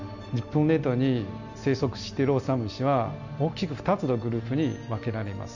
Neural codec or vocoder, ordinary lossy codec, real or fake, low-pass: none; none; real; 7.2 kHz